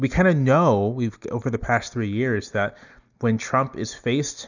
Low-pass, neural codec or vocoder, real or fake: 7.2 kHz; none; real